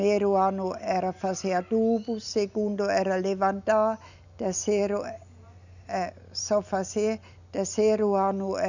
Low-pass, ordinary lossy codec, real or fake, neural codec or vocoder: 7.2 kHz; none; real; none